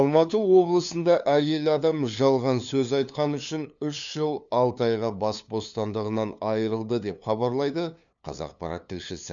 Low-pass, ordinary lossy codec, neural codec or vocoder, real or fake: 7.2 kHz; none; codec, 16 kHz, 2 kbps, FunCodec, trained on LibriTTS, 25 frames a second; fake